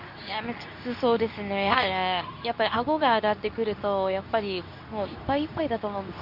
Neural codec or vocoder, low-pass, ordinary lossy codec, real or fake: codec, 24 kHz, 0.9 kbps, WavTokenizer, medium speech release version 2; 5.4 kHz; none; fake